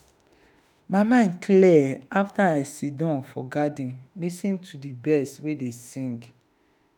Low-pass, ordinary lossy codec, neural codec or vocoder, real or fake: 19.8 kHz; none; autoencoder, 48 kHz, 32 numbers a frame, DAC-VAE, trained on Japanese speech; fake